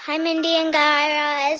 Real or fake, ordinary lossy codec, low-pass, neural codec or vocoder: real; Opus, 16 kbps; 7.2 kHz; none